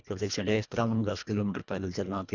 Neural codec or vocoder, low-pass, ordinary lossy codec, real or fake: codec, 24 kHz, 1.5 kbps, HILCodec; 7.2 kHz; none; fake